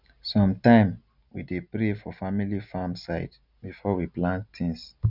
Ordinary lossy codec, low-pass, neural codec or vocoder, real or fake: none; 5.4 kHz; none; real